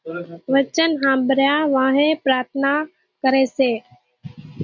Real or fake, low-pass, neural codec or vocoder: real; 7.2 kHz; none